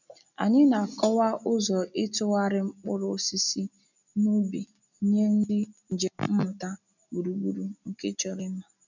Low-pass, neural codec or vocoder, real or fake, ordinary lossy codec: 7.2 kHz; none; real; none